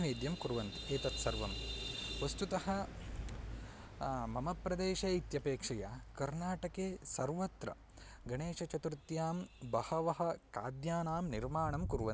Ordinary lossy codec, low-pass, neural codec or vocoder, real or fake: none; none; none; real